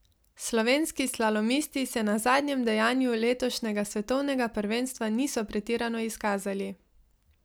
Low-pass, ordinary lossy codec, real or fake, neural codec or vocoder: none; none; real; none